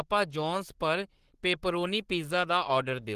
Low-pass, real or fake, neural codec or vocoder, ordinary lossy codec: 14.4 kHz; fake; codec, 44.1 kHz, 7.8 kbps, Pupu-Codec; Opus, 16 kbps